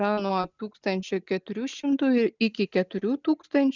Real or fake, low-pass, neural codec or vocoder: real; 7.2 kHz; none